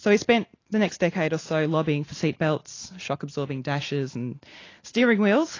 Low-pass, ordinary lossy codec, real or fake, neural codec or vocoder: 7.2 kHz; AAC, 32 kbps; real; none